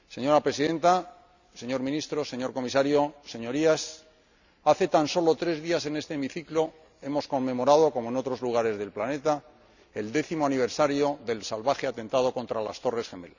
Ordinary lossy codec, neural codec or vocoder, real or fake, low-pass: none; none; real; 7.2 kHz